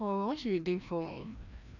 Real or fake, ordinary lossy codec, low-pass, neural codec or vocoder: fake; none; 7.2 kHz; codec, 16 kHz, 1 kbps, FreqCodec, larger model